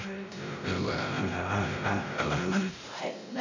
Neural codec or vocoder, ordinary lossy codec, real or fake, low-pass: codec, 16 kHz, 0.5 kbps, X-Codec, WavLM features, trained on Multilingual LibriSpeech; none; fake; 7.2 kHz